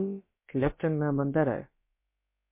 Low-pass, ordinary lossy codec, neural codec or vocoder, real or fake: 3.6 kHz; MP3, 24 kbps; codec, 16 kHz, about 1 kbps, DyCAST, with the encoder's durations; fake